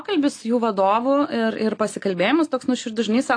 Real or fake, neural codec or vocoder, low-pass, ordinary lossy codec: real; none; 9.9 kHz; AAC, 48 kbps